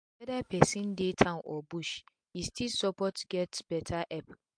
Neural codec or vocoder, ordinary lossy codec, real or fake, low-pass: none; MP3, 64 kbps; real; 9.9 kHz